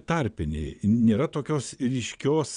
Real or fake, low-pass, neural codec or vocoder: fake; 9.9 kHz; vocoder, 22.05 kHz, 80 mel bands, WaveNeXt